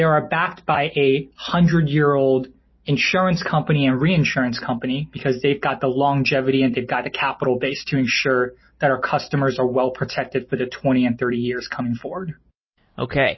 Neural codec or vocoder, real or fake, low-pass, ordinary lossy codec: none; real; 7.2 kHz; MP3, 24 kbps